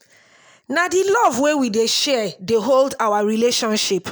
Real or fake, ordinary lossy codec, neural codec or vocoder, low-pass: real; none; none; none